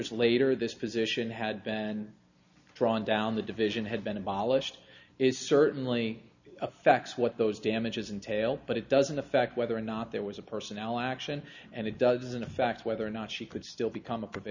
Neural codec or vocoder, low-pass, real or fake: none; 7.2 kHz; real